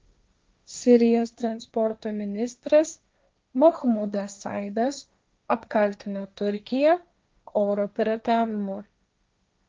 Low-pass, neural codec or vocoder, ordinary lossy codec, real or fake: 7.2 kHz; codec, 16 kHz, 1.1 kbps, Voila-Tokenizer; Opus, 24 kbps; fake